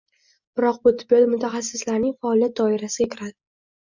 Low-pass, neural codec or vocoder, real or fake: 7.2 kHz; none; real